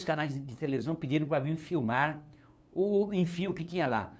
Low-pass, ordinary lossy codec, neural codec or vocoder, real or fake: none; none; codec, 16 kHz, 2 kbps, FunCodec, trained on LibriTTS, 25 frames a second; fake